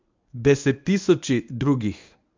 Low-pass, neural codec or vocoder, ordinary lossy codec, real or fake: 7.2 kHz; codec, 24 kHz, 0.9 kbps, WavTokenizer, medium speech release version 1; MP3, 64 kbps; fake